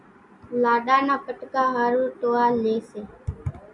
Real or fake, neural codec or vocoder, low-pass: real; none; 10.8 kHz